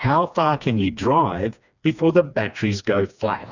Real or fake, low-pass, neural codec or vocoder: fake; 7.2 kHz; codec, 16 kHz, 2 kbps, FreqCodec, smaller model